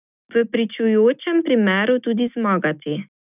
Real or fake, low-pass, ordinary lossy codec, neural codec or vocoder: real; 3.6 kHz; none; none